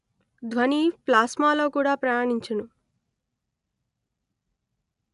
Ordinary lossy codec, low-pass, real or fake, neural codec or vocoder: none; 10.8 kHz; real; none